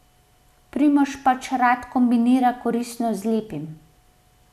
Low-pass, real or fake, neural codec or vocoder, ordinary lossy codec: 14.4 kHz; real; none; none